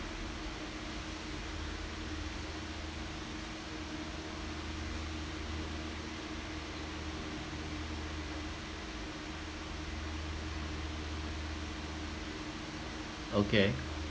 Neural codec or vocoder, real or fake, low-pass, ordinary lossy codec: none; real; none; none